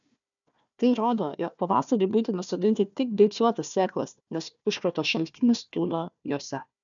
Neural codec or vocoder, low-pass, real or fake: codec, 16 kHz, 1 kbps, FunCodec, trained on Chinese and English, 50 frames a second; 7.2 kHz; fake